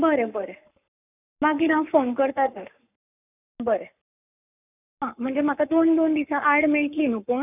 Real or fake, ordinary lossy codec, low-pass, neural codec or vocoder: fake; none; 3.6 kHz; codec, 44.1 kHz, 7.8 kbps, Pupu-Codec